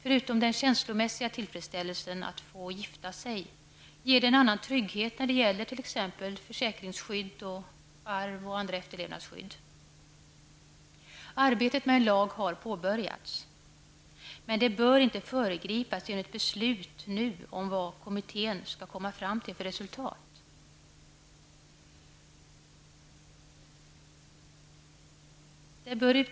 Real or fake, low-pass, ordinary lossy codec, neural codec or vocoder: real; none; none; none